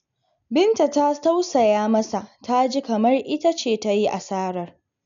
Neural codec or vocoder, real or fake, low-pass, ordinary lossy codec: none; real; 7.2 kHz; none